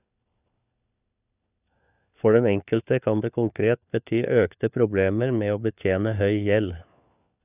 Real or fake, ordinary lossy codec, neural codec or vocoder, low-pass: fake; none; codec, 16 kHz, 4 kbps, FunCodec, trained on LibriTTS, 50 frames a second; 3.6 kHz